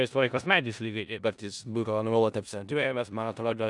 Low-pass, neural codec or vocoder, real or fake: 10.8 kHz; codec, 16 kHz in and 24 kHz out, 0.4 kbps, LongCat-Audio-Codec, four codebook decoder; fake